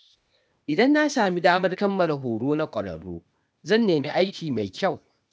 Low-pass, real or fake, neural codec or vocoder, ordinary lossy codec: none; fake; codec, 16 kHz, 0.8 kbps, ZipCodec; none